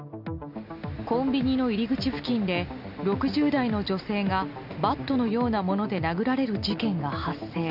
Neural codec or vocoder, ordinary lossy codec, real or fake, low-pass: none; none; real; 5.4 kHz